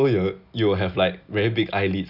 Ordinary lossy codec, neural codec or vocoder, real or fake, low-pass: none; none; real; 5.4 kHz